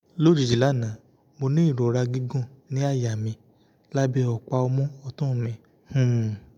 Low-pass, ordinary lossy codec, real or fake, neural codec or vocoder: 19.8 kHz; none; real; none